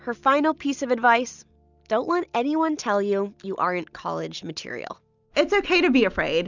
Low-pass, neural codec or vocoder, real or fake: 7.2 kHz; none; real